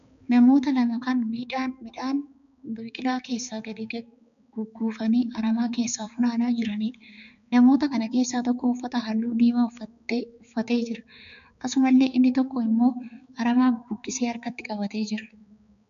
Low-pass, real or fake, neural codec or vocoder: 7.2 kHz; fake; codec, 16 kHz, 4 kbps, X-Codec, HuBERT features, trained on balanced general audio